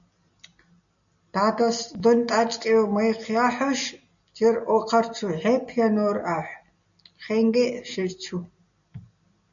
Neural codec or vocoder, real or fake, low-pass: none; real; 7.2 kHz